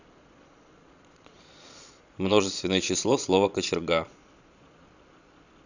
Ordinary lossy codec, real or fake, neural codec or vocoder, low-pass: none; fake; vocoder, 22.05 kHz, 80 mel bands, Vocos; 7.2 kHz